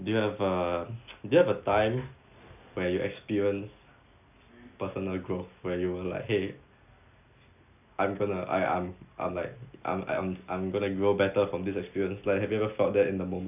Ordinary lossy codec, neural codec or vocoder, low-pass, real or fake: none; none; 3.6 kHz; real